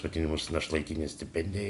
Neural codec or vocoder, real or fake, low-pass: none; real; 10.8 kHz